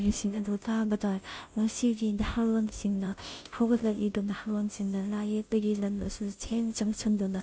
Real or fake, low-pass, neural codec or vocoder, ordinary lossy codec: fake; none; codec, 16 kHz, 0.5 kbps, FunCodec, trained on Chinese and English, 25 frames a second; none